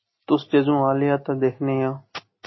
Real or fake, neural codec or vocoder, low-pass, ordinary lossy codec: real; none; 7.2 kHz; MP3, 24 kbps